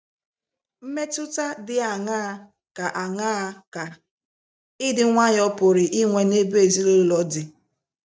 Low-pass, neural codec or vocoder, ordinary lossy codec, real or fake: none; none; none; real